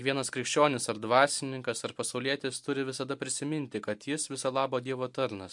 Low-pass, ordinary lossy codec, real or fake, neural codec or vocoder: 10.8 kHz; MP3, 64 kbps; real; none